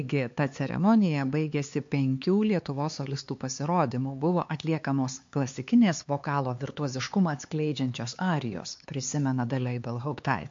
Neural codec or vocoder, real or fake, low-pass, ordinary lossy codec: codec, 16 kHz, 4 kbps, X-Codec, HuBERT features, trained on LibriSpeech; fake; 7.2 kHz; MP3, 48 kbps